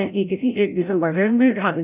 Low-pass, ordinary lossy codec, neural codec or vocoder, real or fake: 3.6 kHz; none; codec, 16 kHz, 0.5 kbps, FreqCodec, larger model; fake